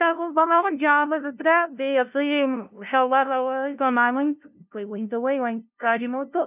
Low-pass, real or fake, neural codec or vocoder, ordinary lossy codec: 3.6 kHz; fake; codec, 16 kHz, 0.5 kbps, FunCodec, trained on LibriTTS, 25 frames a second; none